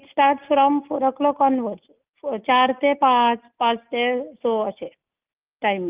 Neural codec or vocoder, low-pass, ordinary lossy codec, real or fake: none; 3.6 kHz; Opus, 24 kbps; real